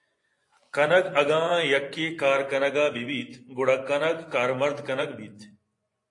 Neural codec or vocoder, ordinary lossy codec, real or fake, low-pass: vocoder, 24 kHz, 100 mel bands, Vocos; AAC, 48 kbps; fake; 10.8 kHz